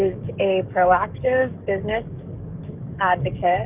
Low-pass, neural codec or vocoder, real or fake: 3.6 kHz; codec, 44.1 kHz, 7.8 kbps, DAC; fake